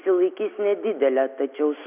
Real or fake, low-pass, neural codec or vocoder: real; 3.6 kHz; none